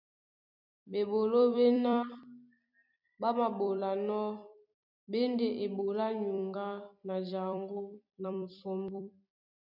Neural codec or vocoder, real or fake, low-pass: vocoder, 44.1 kHz, 128 mel bands every 256 samples, BigVGAN v2; fake; 5.4 kHz